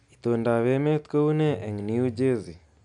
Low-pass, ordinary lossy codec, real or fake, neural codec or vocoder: 9.9 kHz; none; real; none